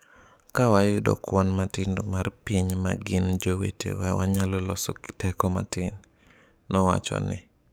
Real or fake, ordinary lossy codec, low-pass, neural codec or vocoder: fake; none; none; codec, 44.1 kHz, 7.8 kbps, DAC